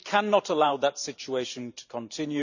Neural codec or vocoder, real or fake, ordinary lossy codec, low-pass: vocoder, 44.1 kHz, 128 mel bands every 512 samples, BigVGAN v2; fake; none; 7.2 kHz